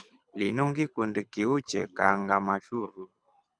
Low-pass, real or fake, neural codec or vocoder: 9.9 kHz; fake; codec, 24 kHz, 6 kbps, HILCodec